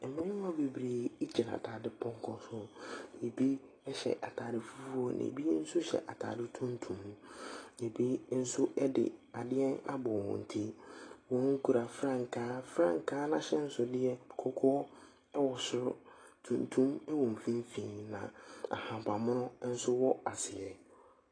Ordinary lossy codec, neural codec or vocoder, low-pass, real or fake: AAC, 32 kbps; none; 9.9 kHz; real